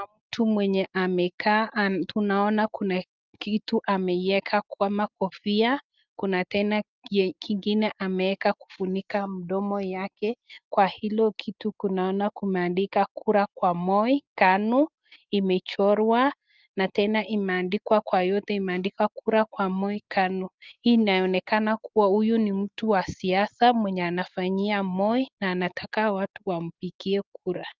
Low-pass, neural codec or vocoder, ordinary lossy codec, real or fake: 7.2 kHz; none; Opus, 32 kbps; real